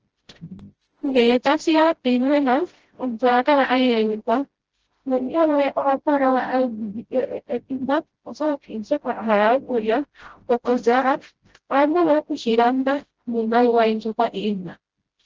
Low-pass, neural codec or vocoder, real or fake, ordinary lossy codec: 7.2 kHz; codec, 16 kHz, 0.5 kbps, FreqCodec, smaller model; fake; Opus, 16 kbps